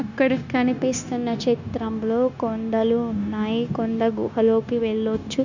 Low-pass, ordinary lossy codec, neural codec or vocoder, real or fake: 7.2 kHz; none; codec, 16 kHz, 0.9 kbps, LongCat-Audio-Codec; fake